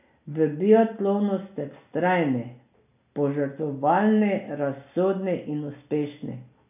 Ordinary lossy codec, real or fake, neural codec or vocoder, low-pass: none; real; none; 3.6 kHz